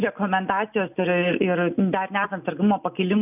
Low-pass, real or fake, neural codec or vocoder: 3.6 kHz; real; none